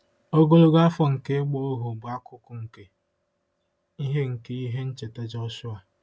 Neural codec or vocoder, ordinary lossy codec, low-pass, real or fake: none; none; none; real